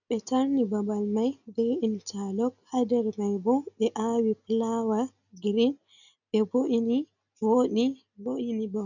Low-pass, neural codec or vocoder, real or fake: 7.2 kHz; none; real